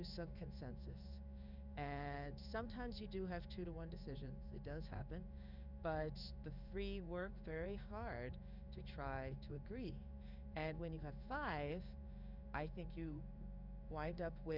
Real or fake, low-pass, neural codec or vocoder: fake; 5.4 kHz; codec, 16 kHz in and 24 kHz out, 1 kbps, XY-Tokenizer